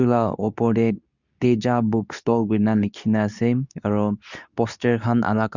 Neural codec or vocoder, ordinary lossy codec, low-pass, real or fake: codec, 24 kHz, 0.9 kbps, WavTokenizer, medium speech release version 2; none; 7.2 kHz; fake